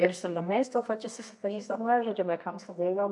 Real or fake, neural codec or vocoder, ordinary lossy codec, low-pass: fake; codec, 24 kHz, 0.9 kbps, WavTokenizer, medium music audio release; AAC, 64 kbps; 10.8 kHz